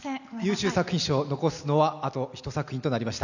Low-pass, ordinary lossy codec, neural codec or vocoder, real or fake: 7.2 kHz; none; none; real